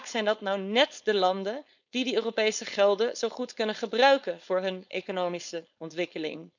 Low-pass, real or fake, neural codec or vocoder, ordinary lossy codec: 7.2 kHz; fake; codec, 16 kHz, 4.8 kbps, FACodec; none